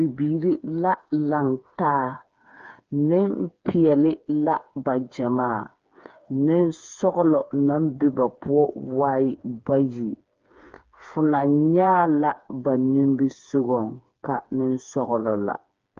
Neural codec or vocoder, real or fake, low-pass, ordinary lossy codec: codec, 16 kHz, 4 kbps, FreqCodec, smaller model; fake; 7.2 kHz; Opus, 24 kbps